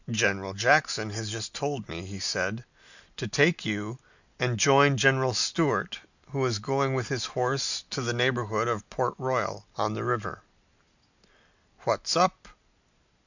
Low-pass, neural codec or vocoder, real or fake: 7.2 kHz; none; real